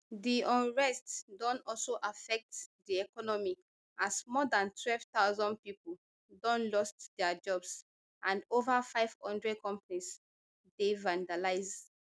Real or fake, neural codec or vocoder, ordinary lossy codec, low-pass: real; none; none; none